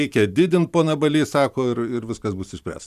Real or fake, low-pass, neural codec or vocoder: fake; 14.4 kHz; vocoder, 44.1 kHz, 128 mel bands every 512 samples, BigVGAN v2